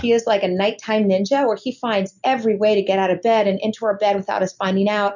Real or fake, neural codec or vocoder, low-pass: real; none; 7.2 kHz